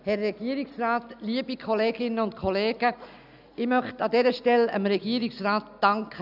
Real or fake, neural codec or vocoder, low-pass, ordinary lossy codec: real; none; 5.4 kHz; none